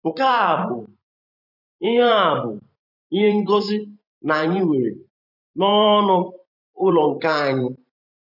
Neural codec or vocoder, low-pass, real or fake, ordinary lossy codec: vocoder, 44.1 kHz, 128 mel bands every 512 samples, BigVGAN v2; 5.4 kHz; fake; none